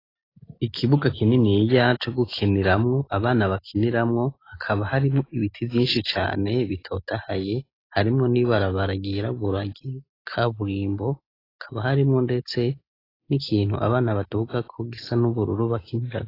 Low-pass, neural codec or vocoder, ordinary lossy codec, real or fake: 5.4 kHz; none; AAC, 24 kbps; real